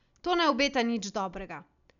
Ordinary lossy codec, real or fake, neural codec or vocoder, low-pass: none; real; none; 7.2 kHz